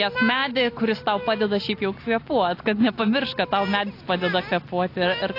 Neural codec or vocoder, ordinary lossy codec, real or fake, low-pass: none; AAC, 32 kbps; real; 5.4 kHz